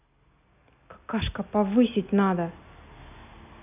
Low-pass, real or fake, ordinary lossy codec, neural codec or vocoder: 3.6 kHz; real; none; none